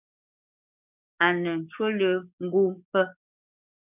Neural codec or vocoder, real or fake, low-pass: codec, 44.1 kHz, 7.8 kbps, DAC; fake; 3.6 kHz